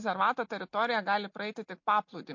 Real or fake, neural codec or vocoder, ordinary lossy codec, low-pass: real; none; MP3, 48 kbps; 7.2 kHz